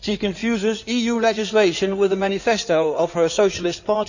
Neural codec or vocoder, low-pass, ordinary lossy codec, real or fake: codec, 16 kHz in and 24 kHz out, 2.2 kbps, FireRedTTS-2 codec; 7.2 kHz; none; fake